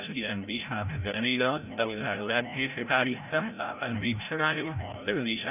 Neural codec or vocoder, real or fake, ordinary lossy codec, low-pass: codec, 16 kHz, 0.5 kbps, FreqCodec, larger model; fake; none; 3.6 kHz